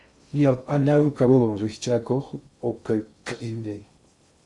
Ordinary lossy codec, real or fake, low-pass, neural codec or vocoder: Opus, 64 kbps; fake; 10.8 kHz; codec, 16 kHz in and 24 kHz out, 0.6 kbps, FocalCodec, streaming, 2048 codes